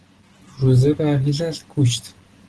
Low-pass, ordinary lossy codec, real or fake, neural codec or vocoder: 10.8 kHz; Opus, 16 kbps; real; none